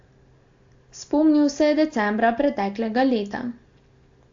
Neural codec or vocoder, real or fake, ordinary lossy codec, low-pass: none; real; AAC, 48 kbps; 7.2 kHz